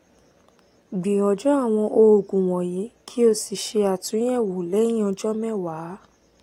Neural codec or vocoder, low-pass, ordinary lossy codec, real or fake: none; 19.8 kHz; AAC, 48 kbps; real